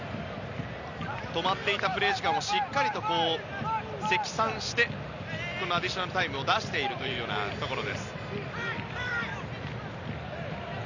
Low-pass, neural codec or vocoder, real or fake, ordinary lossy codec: 7.2 kHz; none; real; none